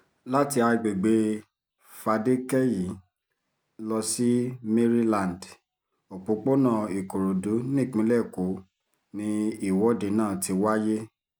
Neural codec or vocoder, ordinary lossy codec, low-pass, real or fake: none; none; none; real